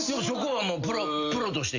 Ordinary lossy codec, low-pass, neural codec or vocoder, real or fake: Opus, 64 kbps; 7.2 kHz; none; real